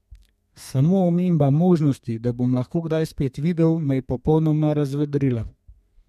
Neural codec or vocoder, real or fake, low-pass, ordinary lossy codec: codec, 32 kHz, 1.9 kbps, SNAC; fake; 14.4 kHz; MP3, 64 kbps